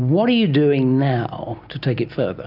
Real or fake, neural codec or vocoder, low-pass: real; none; 5.4 kHz